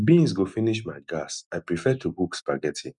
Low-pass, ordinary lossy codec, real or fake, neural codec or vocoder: 10.8 kHz; none; real; none